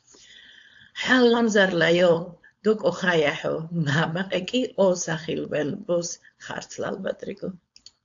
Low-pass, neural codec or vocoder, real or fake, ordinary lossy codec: 7.2 kHz; codec, 16 kHz, 4.8 kbps, FACodec; fake; AAC, 64 kbps